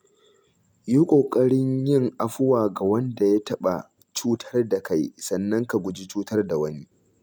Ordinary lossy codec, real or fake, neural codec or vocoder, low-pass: none; real; none; none